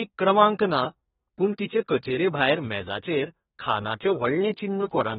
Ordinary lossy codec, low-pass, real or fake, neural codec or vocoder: AAC, 16 kbps; 14.4 kHz; fake; codec, 32 kHz, 1.9 kbps, SNAC